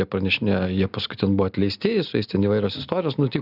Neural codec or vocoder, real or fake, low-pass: none; real; 5.4 kHz